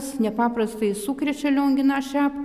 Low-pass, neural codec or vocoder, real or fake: 14.4 kHz; none; real